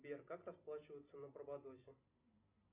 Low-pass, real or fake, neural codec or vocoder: 3.6 kHz; real; none